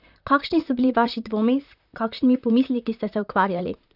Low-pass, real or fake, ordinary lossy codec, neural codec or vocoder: 5.4 kHz; fake; none; vocoder, 44.1 kHz, 128 mel bands, Pupu-Vocoder